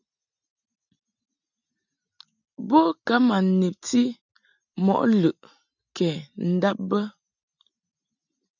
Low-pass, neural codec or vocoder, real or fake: 7.2 kHz; none; real